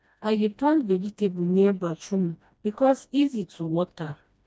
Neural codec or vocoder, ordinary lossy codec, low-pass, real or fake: codec, 16 kHz, 1 kbps, FreqCodec, smaller model; none; none; fake